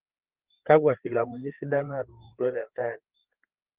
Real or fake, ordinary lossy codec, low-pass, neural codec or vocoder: fake; Opus, 16 kbps; 3.6 kHz; codec, 16 kHz, 4 kbps, FreqCodec, larger model